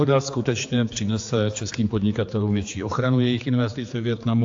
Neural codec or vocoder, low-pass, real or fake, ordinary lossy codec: codec, 16 kHz, 4 kbps, X-Codec, HuBERT features, trained on general audio; 7.2 kHz; fake; AAC, 48 kbps